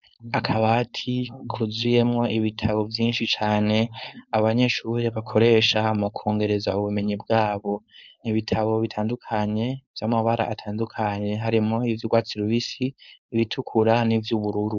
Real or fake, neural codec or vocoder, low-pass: fake; codec, 16 kHz, 4.8 kbps, FACodec; 7.2 kHz